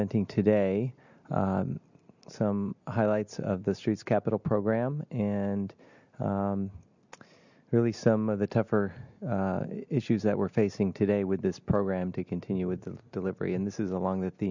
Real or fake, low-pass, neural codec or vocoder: real; 7.2 kHz; none